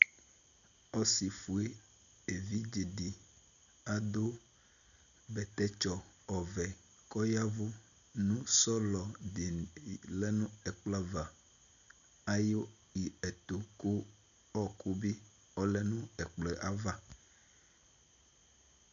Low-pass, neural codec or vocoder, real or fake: 7.2 kHz; none; real